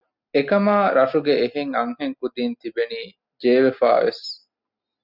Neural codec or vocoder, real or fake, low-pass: none; real; 5.4 kHz